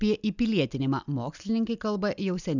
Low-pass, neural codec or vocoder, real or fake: 7.2 kHz; none; real